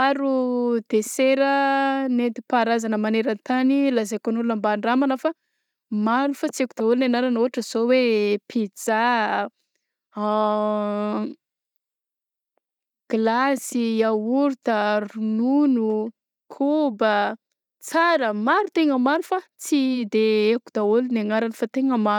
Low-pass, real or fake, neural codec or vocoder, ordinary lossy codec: 19.8 kHz; real; none; none